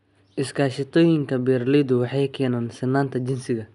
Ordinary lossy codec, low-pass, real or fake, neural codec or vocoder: none; 10.8 kHz; real; none